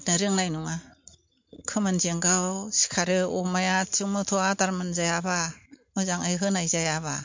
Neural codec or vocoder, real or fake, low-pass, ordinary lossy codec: none; real; 7.2 kHz; MP3, 48 kbps